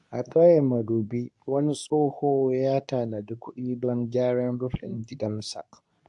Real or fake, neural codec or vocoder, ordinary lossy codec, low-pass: fake; codec, 24 kHz, 0.9 kbps, WavTokenizer, medium speech release version 2; AAC, 64 kbps; 10.8 kHz